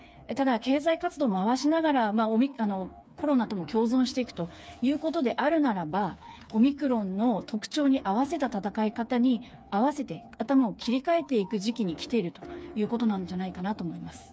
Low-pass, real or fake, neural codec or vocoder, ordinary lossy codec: none; fake; codec, 16 kHz, 4 kbps, FreqCodec, smaller model; none